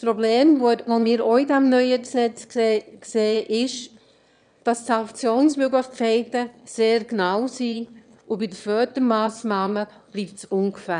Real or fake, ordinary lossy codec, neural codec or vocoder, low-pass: fake; none; autoencoder, 22.05 kHz, a latent of 192 numbers a frame, VITS, trained on one speaker; 9.9 kHz